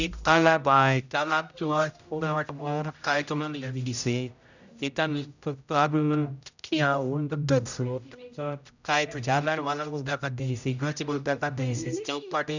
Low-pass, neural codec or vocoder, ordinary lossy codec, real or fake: 7.2 kHz; codec, 16 kHz, 0.5 kbps, X-Codec, HuBERT features, trained on general audio; none; fake